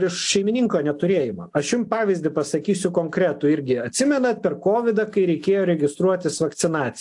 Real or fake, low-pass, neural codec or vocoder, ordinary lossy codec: real; 10.8 kHz; none; AAC, 64 kbps